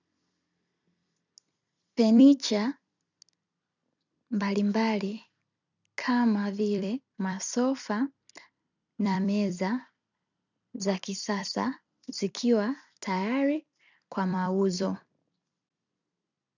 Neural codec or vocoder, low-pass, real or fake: vocoder, 44.1 kHz, 128 mel bands every 256 samples, BigVGAN v2; 7.2 kHz; fake